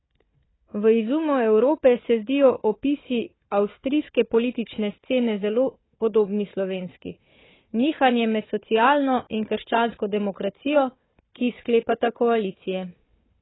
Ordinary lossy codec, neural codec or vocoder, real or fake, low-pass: AAC, 16 kbps; codec, 16 kHz, 4 kbps, FunCodec, trained on Chinese and English, 50 frames a second; fake; 7.2 kHz